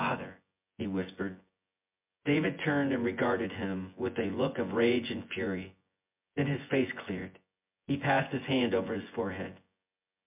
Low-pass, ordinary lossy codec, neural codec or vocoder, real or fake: 3.6 kHz; MP3, 32 kbps; vocoder, 24 kHz, 100 mel bands, Vocos; fake